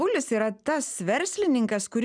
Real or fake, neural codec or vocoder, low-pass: real; none; 9.9 kHz